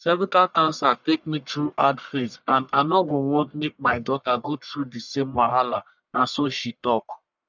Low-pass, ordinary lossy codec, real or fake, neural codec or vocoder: 7.2 kHz; none; fake; codec, 44.1 kHz, 1.7 kbps, Pupu-Codec